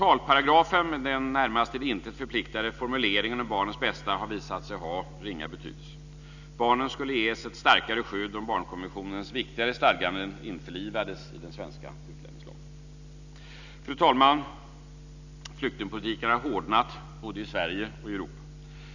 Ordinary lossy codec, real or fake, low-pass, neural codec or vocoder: none; real; 7.2 kHz; none